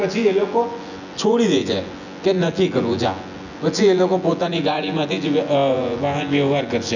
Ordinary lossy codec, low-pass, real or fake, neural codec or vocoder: none; 7.2 kHz; fake; vocoder, 24 kHz, 100 mel bands, Vocos